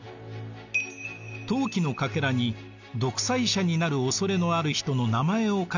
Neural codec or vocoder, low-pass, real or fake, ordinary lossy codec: none; 7.2 kHz; real; none